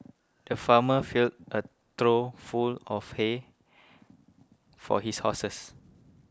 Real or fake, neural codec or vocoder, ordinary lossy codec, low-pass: real; none; none; none